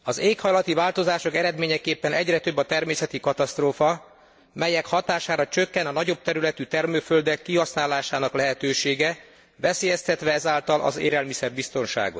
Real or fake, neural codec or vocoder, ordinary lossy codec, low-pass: real; none; none; none